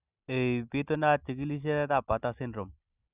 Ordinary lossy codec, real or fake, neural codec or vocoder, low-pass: Opus, 64 kbps; real; none; 3.6 kHz